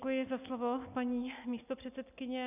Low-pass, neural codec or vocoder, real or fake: 3.6 kHz; none; real